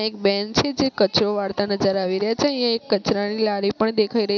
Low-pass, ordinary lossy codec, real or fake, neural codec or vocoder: none; none; real; none